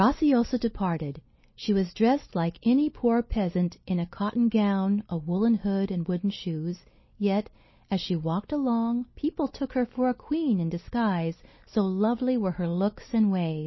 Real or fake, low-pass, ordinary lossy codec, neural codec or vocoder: real; 7.2 kHz; MP3, 24 kbps; none